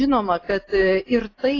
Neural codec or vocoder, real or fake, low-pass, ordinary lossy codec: none; real; 7.2 kHz; AAC, 32 kbps